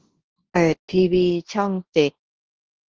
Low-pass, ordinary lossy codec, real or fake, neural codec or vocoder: 7.2 kHz; Opus, 16 kbps; fake; codec, 24 kHz, 0.9 kbps, WavTokenizer, large speech release